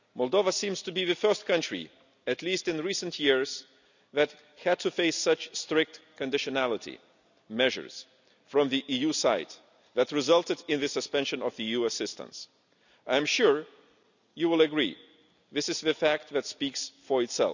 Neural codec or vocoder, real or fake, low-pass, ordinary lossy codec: none; real; 7.2 kHz; none